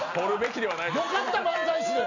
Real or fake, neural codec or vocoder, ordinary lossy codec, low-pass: real; none; none; 7.2 kHz